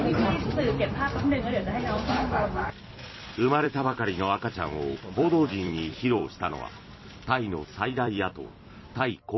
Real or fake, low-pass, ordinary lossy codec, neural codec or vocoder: fake; 7.2 kHz; MP3, 24 kbps; vocoder, 44.1 kHz, 128 mel bands every 256 samples, BigVGAN v2